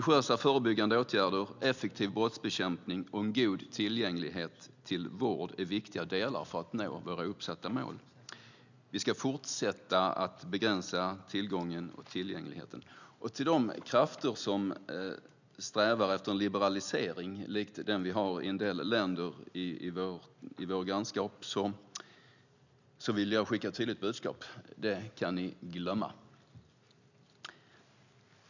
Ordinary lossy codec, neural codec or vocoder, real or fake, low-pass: none; none; real; 7.2 kHz